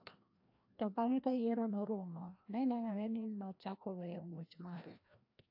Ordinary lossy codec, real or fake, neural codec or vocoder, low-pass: AAC, 32 kbps; fake; codec, 16 kHz, 1 kbps, FreqCodec, larger model; 5.4 kHz